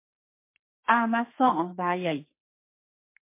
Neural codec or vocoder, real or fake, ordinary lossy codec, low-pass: codec, 44.1 kHz, 2.6 kbps, SNAC; fake; MP3, 24 kbps; 3.6 kHz